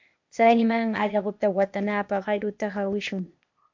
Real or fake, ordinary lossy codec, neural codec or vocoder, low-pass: fake; MP3, 48 kbps; codec, 16 kHz, 0.8 kbps, ZipCodec; 7.2 kHz